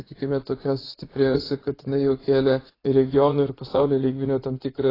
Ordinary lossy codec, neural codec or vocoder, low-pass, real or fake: AAC, 24 kbps; vocoder, 44.1 kHz, 80 mel bands, Vocos; 5.4 kHz; fake